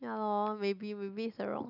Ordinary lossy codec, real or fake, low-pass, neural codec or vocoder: none; real; 5.4 kHz; none